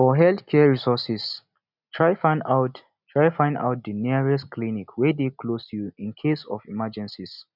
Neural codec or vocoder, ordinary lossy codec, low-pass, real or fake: none; none; 5.4 kHz; real